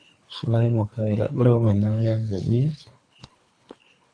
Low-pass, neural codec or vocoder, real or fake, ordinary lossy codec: 9.9 kHz; codec, 24 kHz, 3 kbps, HILCodec; fake; AAC, 48 kbps